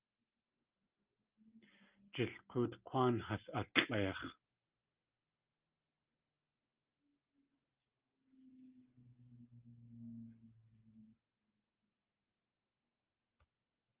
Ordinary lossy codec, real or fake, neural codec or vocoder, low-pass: Opus, 24 kbps; real; none; 3.6 kHz